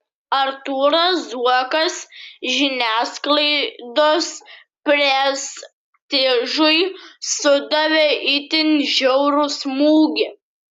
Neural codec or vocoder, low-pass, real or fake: none; 14.4 kHz; real